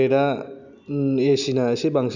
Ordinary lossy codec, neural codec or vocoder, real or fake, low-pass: none; none; real; 7.2 kHz